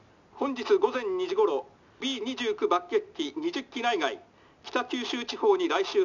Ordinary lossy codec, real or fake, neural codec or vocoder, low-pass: none; real; none; 7.2 kHz